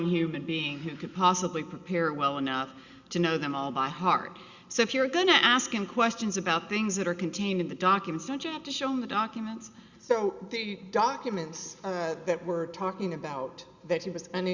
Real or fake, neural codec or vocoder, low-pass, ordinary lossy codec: real; none; 7.2 kHz; Opus, 64 kbps